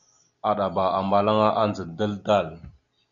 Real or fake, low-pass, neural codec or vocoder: real; 7.2 kHz; none